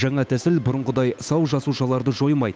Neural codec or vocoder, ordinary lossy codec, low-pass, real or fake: codec, 16 kHz, 8 kbps, FunCodec, trained on Chinese and English, 25 frames a second; none; none; fake